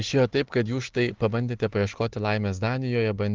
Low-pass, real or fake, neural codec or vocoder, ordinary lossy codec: 7.2 kHz; real; none; Opus, 16 kbps